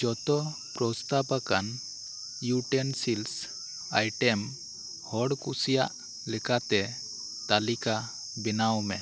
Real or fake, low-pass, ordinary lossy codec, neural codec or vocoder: real; none; none; none